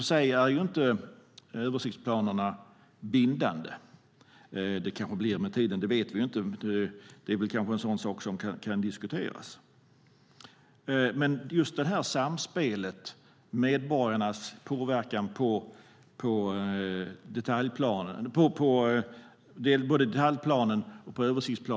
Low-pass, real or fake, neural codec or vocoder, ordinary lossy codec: none; real; none; none